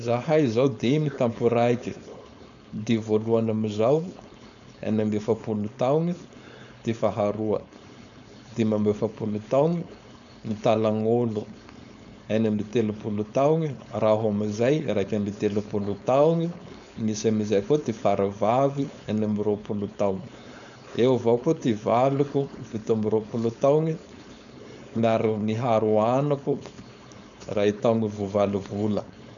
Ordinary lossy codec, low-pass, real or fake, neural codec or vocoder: none; 7.2 kHz; fake; codec, 16 kHz, 4.8 kbps, FACodec